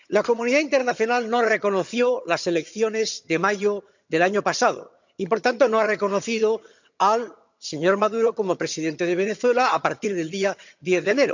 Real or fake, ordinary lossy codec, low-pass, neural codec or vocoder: fake; none; 7.2 kHz; vocoder, 22.05 kHz, 80 mel bands, HiFi-GAN